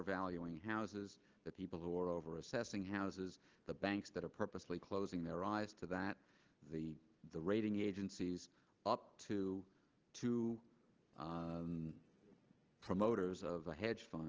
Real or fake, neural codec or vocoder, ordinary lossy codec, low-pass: real; none; Opus, 16 kbps; 7.2 kHz